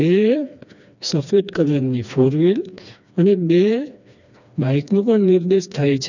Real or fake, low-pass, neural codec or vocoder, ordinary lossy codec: fake; 7.2 kHz; codec, 16 kHz, 2 kbps, FreqCodec, smaller model; none